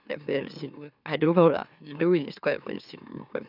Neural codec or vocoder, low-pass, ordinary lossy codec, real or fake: autoencoder, 44.1 kHz, a latent of 192 numbers a frame, MeloTTS; 5.4 kHz; none; fake